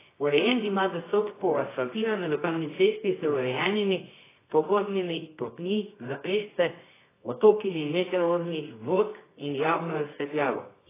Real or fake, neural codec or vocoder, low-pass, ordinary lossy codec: fake; codec, 24 kHz, 0.9 kbps, WavTokenizer, medium music audio release; 3.6 kHz; AAC, 16 kbps